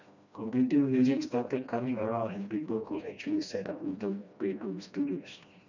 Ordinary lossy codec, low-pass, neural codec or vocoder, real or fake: none; 7.2 kHz; codec, 16 kHz, 1 kbps, FreqCodec, smaller model; fake